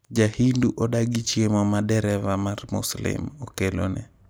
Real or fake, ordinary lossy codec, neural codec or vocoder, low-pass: real; none; none; none